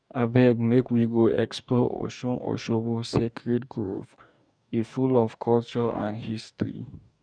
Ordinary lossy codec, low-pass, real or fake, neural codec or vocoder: none; 9.9 kHz; fake; codec, 44.1 kHz, 2.6 kbps, DAC